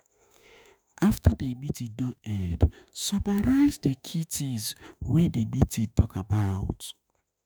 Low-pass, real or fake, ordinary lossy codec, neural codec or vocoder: none; fake; none; autoencoder, 48 kHz, 32 numbers a frame, DAC-VAE, trained on Japanese speech